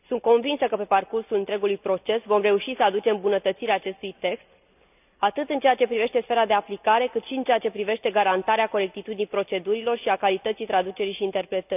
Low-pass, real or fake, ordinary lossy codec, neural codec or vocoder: 3.6 kHz; real; none; none